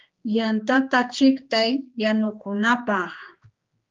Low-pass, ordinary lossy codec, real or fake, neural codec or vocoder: 7.2 kHz; Opus, 32 kbps; fake; codec, 16 kHz, 2 kbps, X-Codec, HuBERT features, trained on general audio